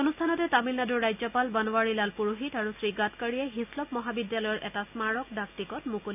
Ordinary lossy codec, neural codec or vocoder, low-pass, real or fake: none; none; 3.6 kHz; real